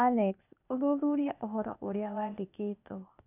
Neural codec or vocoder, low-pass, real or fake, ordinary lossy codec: codec, 16 kHz, 0.8 kbps, ZipCodec; 3.6 kHz; fake; none